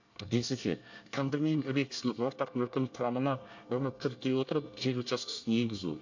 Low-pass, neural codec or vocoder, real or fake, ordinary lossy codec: 7.2 kHz; codec, 24 kHz, 1 kbps, SNAC; fake; AAC, 48 kbps